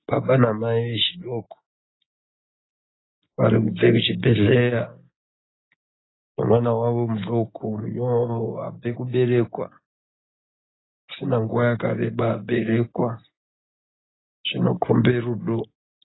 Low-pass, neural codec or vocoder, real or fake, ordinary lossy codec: 7.2 kHz; none; real; AAC, 16 kbps